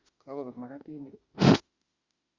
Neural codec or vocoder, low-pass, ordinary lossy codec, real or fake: autoencoder, 48 kHz, 32 numbers a frame, DAC-VAE, trained on Japanese speech; 7.2 kHz; Opus, 64 kbps; fake